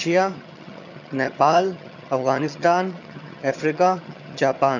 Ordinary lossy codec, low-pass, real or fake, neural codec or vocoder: none; 7.2 kHz; fake; vocoder, 22.05 kHz, 80 mel bands, HiFi-GAN